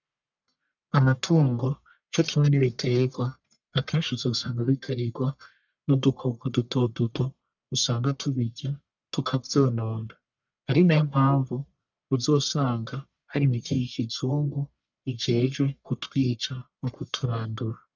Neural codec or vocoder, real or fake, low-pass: codec, 44.1 kHz, 1.7 kbps, Pupu-Codec; fake; 7.2 kHz